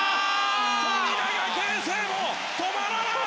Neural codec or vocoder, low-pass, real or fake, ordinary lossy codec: none; none; real; none